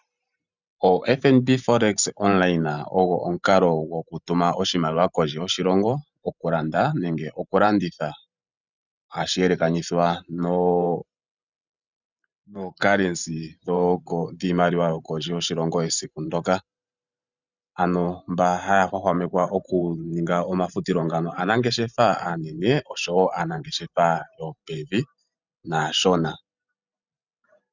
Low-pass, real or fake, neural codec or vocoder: 7.2 kHz; fake; vocoder, 44.1 kHz, 128 mel bands every 512 samples, BigVGAN v2